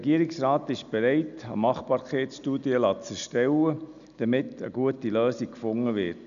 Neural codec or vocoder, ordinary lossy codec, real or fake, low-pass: none; none; real; 7.2 kHz